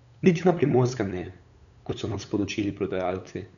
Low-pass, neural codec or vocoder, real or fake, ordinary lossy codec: 7.2 kHz; codec, 16 kHz, 8 kbps, FunCodec, trained on LibriTTS, 25 frames a second; fake; none